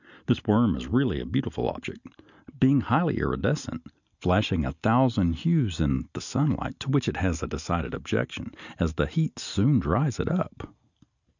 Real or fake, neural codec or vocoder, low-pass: real; none; 7.2 kHz